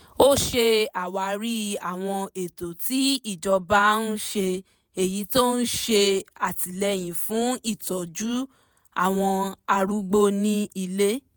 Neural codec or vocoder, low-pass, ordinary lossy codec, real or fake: vocoder, 48 kHz, 128 mel bands, Vocos; none; none; fake